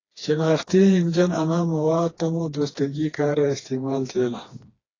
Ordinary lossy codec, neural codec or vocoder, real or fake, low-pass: AAC, 32 kbps; codec, 16 kHz, 2 kbps, FreqCodec, smaller model; fake; 7.2 kHz